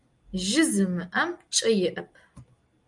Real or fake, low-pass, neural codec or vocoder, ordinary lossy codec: real; 10.8 kHz; none; Opus, 32 kbps